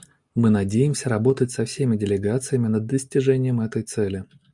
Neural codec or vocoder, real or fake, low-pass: none; real; 10.8 kHz